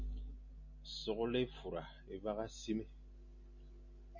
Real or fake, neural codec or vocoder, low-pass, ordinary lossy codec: real; none; 7.2 kHz; MP3, 32 kbps